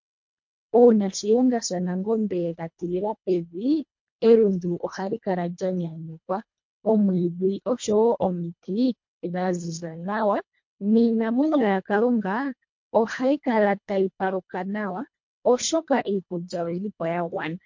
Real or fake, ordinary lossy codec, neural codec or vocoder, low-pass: fake; MP3, 48 kbps; codec, 24 kHz, 1.5 kbps, HILCodec; 7.2 kHz